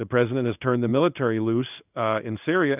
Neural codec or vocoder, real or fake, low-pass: codec, 16 kHz in and 24 kHz out, 1 kbps, XY-Tokenizer; fake; 3.6 kHz